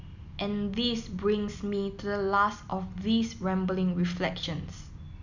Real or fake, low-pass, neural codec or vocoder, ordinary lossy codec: real; 7.2 kHz; none; none